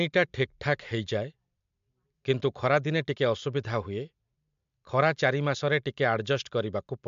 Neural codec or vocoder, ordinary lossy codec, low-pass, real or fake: none; MP3, 64 kbps; 7.2 kHz; real